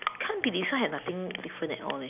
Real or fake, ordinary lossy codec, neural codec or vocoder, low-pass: real; none; none; 3.6 kHz